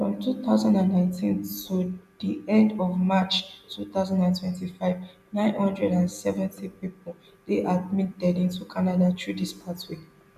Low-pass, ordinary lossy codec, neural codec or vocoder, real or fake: 14.4 kHz; none; none; real